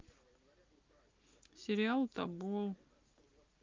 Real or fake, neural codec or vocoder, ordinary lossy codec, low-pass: real; none; Opus, 32 kbps; 7.2 kHz